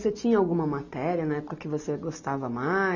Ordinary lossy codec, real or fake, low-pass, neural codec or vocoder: none; real; 7.2 kHz; none